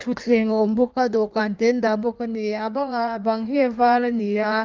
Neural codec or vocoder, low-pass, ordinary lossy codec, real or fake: codec, 16 kHz in and 24 kHz out, 1.1 kbps, FireRedTTS-2 codec; 7.2 kHz; Opus, 24 kbps; fake